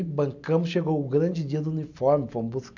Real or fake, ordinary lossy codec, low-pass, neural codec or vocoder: real; none; 7.2 kHz; none